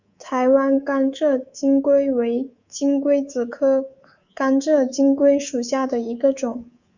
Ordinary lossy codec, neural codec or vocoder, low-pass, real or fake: Opus, 64 kbps; codec, 24 kHz, 3.1 kbps, DualCodec; 7.2 kHz; fake